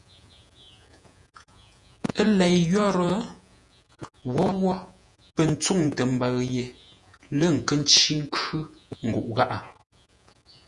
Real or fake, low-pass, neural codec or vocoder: fake; 10.8 kHz; vocoder, 48 kHz, 128 mel bands, Vocos